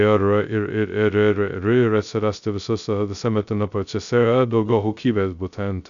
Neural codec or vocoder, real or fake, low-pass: codec, 16 kHz, 0.2 kbps, FocalCodec; fake; 7.2 kHz